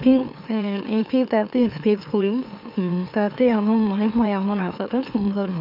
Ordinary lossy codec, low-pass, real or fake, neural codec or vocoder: none; 5.4 kHz; fake; autoencoder, 44.1 kHz, a latent of 192 numbers a frame, MeloTTS